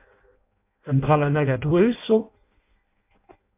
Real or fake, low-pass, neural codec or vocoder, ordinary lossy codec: fake; 3.6 kHz; codec, 16 kHz in and 24 kHz out, 0.6 kbps, FireRedTTS-2 codec; MP3, 32 kbps